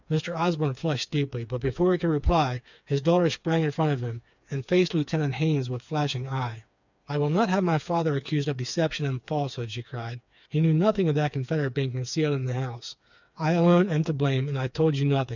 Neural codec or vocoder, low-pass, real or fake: codec, 16 kHz, 4 kbps, FreqCodec, smaller model; 7.2 kHz; fake